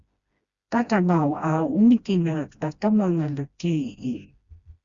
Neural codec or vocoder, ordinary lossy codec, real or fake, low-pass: codec, 16 kHz, 1 kbps, FreqCodec, smaller model; Opus, 64 kbps; fake; 7.2 kHz